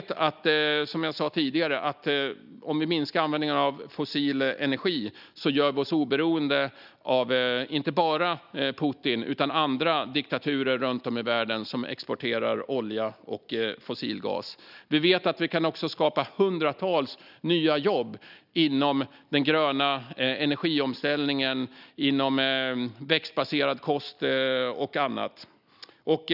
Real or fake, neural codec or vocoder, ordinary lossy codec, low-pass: real; none; none; 5.4 kHz